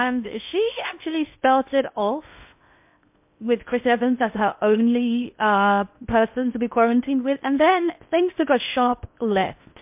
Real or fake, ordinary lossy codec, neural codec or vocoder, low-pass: fake; MP3, 32 kbps; codec, 16 kHz in and 24 kHz out, 0.6 kbps, FocalCodec, streaming, 2048 codes; 3.6 kHz